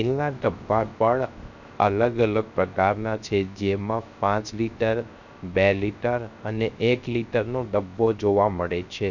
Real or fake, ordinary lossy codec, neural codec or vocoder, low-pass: fake; none; codec, 16 kHz, 0.3 kbps, FocalCodec; 7.2 kHz